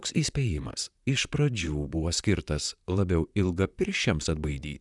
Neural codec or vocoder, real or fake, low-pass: vocoder, 44.1 kHz, 128 mel bands, Pupu-Vocoder; fake; 10.8 kHz